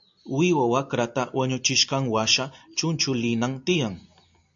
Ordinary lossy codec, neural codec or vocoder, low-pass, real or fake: AAC, 64 kbps; none; 7.2 kHz; real